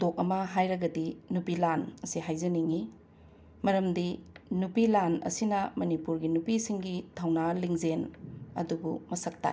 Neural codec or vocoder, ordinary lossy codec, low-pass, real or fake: none; none; none; real